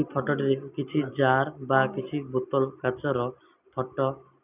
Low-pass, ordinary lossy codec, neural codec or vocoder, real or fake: 3.6 kHz; none; none; real